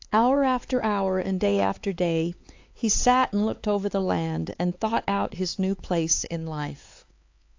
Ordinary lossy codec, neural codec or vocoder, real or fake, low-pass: AAC, 48 kbps; codec, 16 kHz, 4 kbps, X-Codec, HuBERT features, trained on LibriSpeech; fake; 7.2 kHz